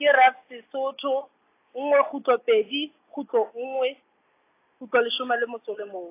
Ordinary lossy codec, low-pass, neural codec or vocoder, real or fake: AAC, 24 kbps; 3.6 kHz; none; real